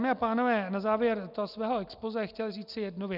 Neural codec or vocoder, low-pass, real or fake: none; 5.4 kHz; real